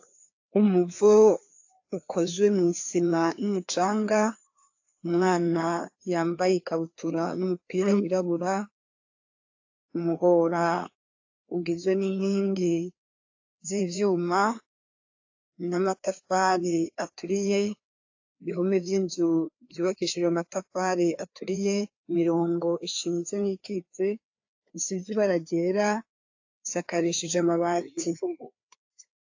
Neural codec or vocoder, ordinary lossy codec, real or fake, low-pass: codec, 16 kHz, 2 kbps, FreqCodec, larger model; AAC, 48 kbps; fake; 7.2 kHz